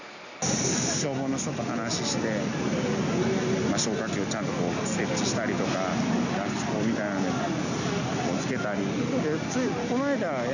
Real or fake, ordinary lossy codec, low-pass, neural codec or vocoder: real; none; 7.2 kHz; none